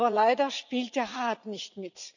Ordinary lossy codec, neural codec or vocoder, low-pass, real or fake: none; vocoder, 44.1 kHz, 80 mel bands, Vocos; 7.2 kHz; fake